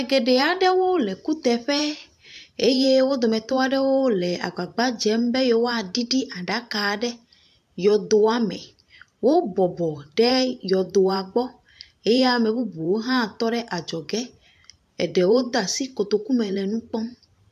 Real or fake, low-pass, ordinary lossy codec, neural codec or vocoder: fake; 14.4 kHz; AAC, 96 kbps; vocoder, 44.1 kHz, 128 mel bands every 512 samples, BigVGAN v2